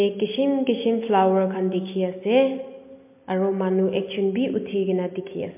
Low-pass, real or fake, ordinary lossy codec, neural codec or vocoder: 3.6 kHz; real; MP3, 24 kbps; none